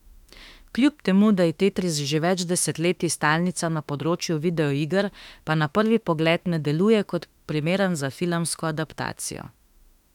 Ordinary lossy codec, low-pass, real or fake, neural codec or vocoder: none; 19.8 kHz; fake; autoencoder, 48 kHz, 32 numbers a frame, DAC-VAE, trained on Japanese speech